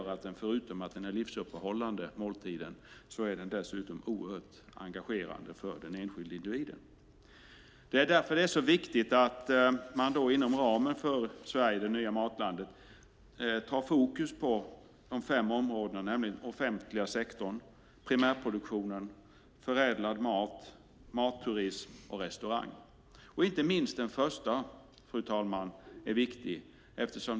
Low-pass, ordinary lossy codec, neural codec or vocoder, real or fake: none; none; none; real